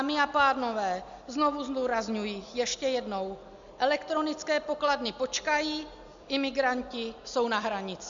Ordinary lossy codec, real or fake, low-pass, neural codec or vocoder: MP3, 64 kbps; real; 7.2 kHz; none